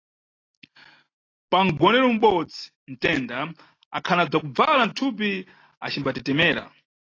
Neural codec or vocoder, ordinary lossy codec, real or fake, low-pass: none; AAC, 32 kbps; real; 7.2 kHz